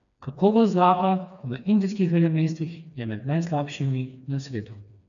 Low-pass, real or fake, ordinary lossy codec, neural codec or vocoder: 7.2 kHz; fake; none; codec, 16 kHz, 2 kbps, FreqCodec, smaller model